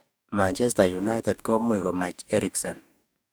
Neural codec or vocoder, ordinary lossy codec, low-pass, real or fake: codec, 44.1 kHz, 2.6 kbps, DAC; none; none; fake